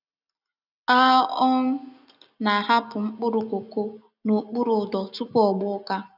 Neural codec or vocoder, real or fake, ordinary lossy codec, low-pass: none; real; none; 5.4 kHz